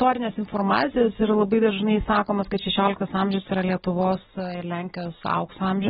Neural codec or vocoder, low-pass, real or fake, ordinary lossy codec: none; 7.2 kHz; real; AAC, 16 kbps